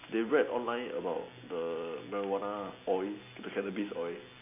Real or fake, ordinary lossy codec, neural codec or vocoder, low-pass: real; MP3, 24 kbps; none; 3.6 kHz